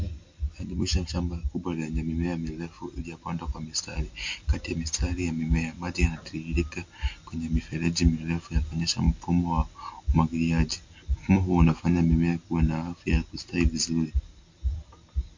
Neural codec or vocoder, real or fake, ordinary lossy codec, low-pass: none; real; MP3, 48 kbps; 7.2 kHz